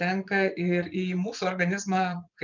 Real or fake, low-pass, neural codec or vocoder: fake; 7.2 kHz; vocoder, 24 kHz, 100 mel bands, Vocos